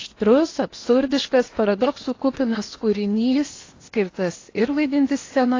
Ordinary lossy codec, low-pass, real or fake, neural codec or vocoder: AAC, 32 kbps; 7.2 kHz; fake; codec, 16 kHz in and 24 kHz out, 0.8 kbps, FocalCodec, streaming, 65536 codes